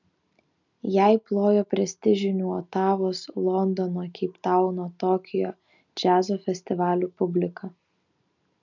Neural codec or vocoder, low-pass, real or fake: none; 7.2 kHz; real